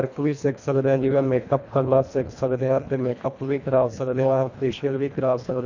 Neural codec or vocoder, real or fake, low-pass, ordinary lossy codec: codec, 24 kHz, 1.5 kbps, HILCodec; fake; 7.2 kHz; none